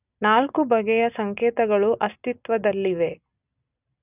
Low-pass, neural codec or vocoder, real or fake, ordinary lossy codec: 3.6 kHz; none; real; none